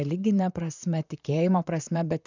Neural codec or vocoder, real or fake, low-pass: vocoder, 44.1 kHz, 128 mel bands, Pupu-Vocoder; fake; 7.2 kHz